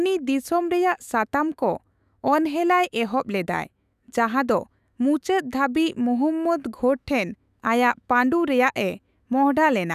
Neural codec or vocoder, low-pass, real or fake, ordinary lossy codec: autoencoder, 48 kHz, 128 numbers a frame, DAC-VAE, trained on Japanese speech; 14.4 kHz; fake; none